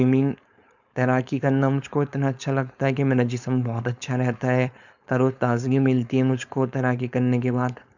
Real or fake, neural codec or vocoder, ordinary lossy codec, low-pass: fake; codec, 16 kHz, 4.8 kbps, FACodec; none; 7.2 kHz